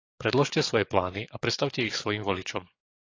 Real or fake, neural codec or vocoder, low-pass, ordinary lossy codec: fake; codec, 44.1 kHz, 7.8 kbps, DAC; 7.2 kHz; AAC, 32 kbps